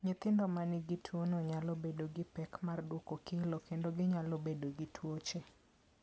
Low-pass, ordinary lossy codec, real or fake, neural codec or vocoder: none; none; real; none